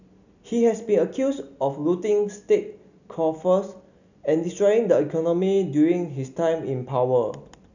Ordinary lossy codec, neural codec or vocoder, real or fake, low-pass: none; none; real; 7.2 kHz